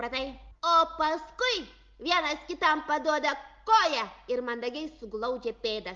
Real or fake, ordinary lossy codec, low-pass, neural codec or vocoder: real; Opus, 32 kbps; 7.2 kHz; none